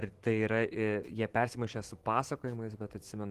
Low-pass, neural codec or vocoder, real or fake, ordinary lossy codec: 14.4 kHz; autoencoder, 48 kHz, 128 numbers a frame, DAC-VAE, trained on Japanese speech; fake; Opus, 16 kbps